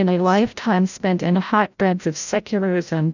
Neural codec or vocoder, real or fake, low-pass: codec, 16 kHz, 0.5 kbps, FreqCodec, larger model; fake; 7.2 kHz